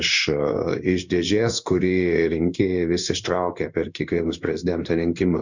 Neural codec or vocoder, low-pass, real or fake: codec, 16 kHz in and 24 kHz out, 1 kbps, XY-Tokenizer; 7.2 kHz; fake